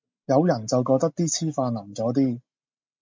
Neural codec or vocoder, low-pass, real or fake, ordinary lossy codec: none; 7.2 kHz; real; MP3, 64 kbps